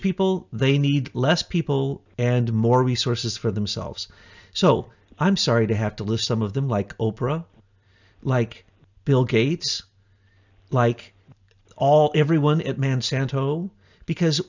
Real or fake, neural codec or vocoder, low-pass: real; none; 7.2 kHz